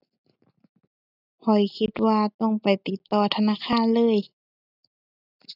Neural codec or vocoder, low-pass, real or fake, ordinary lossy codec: none; 5.4 kHz; real; none